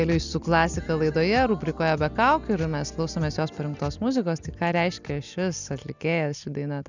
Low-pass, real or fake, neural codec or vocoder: 7.2 kHz; real; none